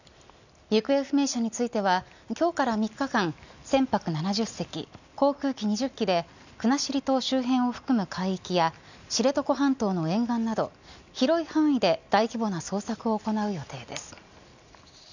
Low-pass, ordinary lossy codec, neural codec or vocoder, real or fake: 7.2 kHz; none; none; real